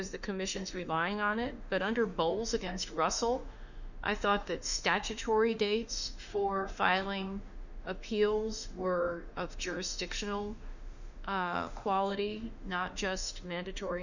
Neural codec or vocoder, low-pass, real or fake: autoencoder, 48 kHz, 32 numbers a frame, DAC-VAE, trained on Japanese speech; 7.2 kHz; fake